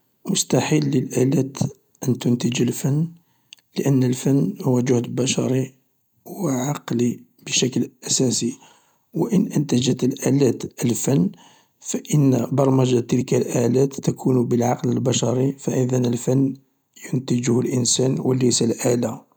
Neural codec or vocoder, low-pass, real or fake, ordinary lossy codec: none; none; real; none